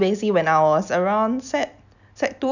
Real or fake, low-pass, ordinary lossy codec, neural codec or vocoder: real; 7.2 kHz; none; none